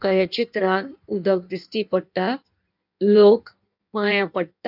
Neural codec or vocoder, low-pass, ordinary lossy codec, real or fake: codec, 16 kHz in and 24 kHz out, 1.1 kbps, FireRedTTS-2 codec; 5.4 kHz; none; fake